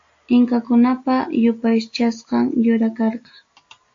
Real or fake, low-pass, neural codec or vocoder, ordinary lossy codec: real; 7.2 kHz; none; AAC, 48 kbps